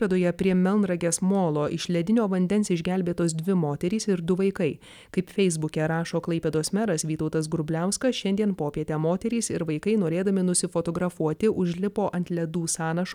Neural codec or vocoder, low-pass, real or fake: none; 19.8 kHz; real